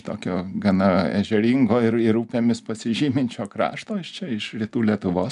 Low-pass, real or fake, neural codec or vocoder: 10.8 kHz; real; none